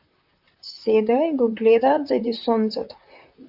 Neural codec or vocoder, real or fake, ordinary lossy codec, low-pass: vocoder, 44.1 kHz, 128 mel bands, Pupu-Vocoder; fake; AAC, 48 kbps; 5.4 kHz